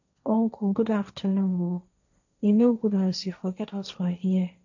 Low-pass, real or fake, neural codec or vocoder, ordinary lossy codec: 7.2 kHz; fake; codec, 16 kHz, 1.1 kbps, Voila-Tokenizer; none